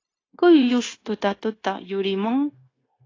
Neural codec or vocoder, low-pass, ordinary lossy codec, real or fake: codec, 16 kHz, 0.9 kbps, LongCat-Audio-Codec; 7.2 kHz; AAC, 32 kbps; fake